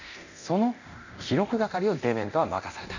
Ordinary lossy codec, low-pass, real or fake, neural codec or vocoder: none; 7.2 kHz; fake; codec, 24 kHz, 0.9 kbps, DualCodec